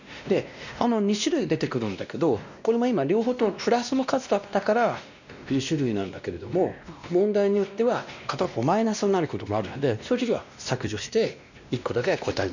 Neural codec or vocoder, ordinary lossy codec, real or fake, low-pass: codec, 16 kHz, 1 kbps, X-Codec, WavLM features, trained on Multilingual LibriSpeech; none; fake; 7.2 kHz